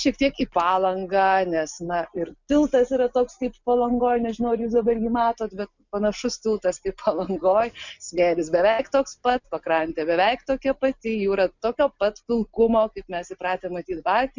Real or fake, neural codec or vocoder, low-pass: real; none; 7.2 kHz